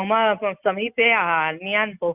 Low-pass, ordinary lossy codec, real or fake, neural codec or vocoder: 3.6 kHz; Opus, 64 kbps; real; none